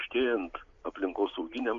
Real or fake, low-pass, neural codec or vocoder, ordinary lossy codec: real; 7.2 kHz; none; MP3, 64 kbps